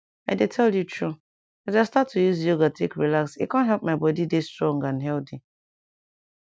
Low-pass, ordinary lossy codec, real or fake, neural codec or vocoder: none; none; real; none